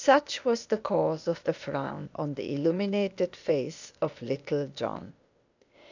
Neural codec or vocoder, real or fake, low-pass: codec, 16 kHz, 0.8 kbps, ZipCodec; fake; 7.2 kHz